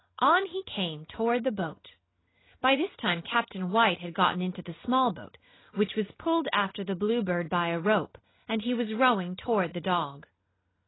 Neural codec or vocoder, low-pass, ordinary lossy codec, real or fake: none; 7.2 kHz; AAC, 16 kbps; real